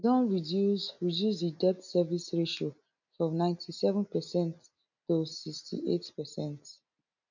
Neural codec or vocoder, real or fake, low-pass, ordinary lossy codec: none; real; 7.2 kHz; none